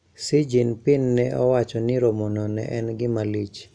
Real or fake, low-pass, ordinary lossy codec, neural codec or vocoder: real; 9.9 kHz; none; none